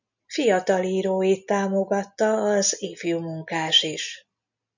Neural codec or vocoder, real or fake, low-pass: none; real; 7.2 kHz